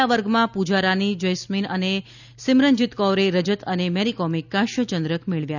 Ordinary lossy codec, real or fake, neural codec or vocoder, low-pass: none; real; none; 7.2 kHz